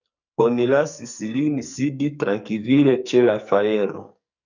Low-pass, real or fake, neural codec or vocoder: 7.2 kHz; fake; codec, 44.1 kHz, 2.6 kbps, SNAC